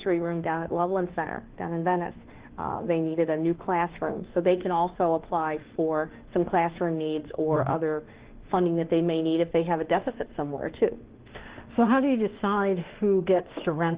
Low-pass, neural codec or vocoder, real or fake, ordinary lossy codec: 3.6 kHz; autoencoder, 48 kHz, 32 numbers a frame, DAC-VAE, trained on Japanese speech; fake; Opus, 16 kbps